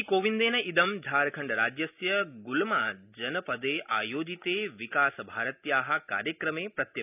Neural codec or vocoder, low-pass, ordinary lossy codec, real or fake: none; 3.6 kHz; none; real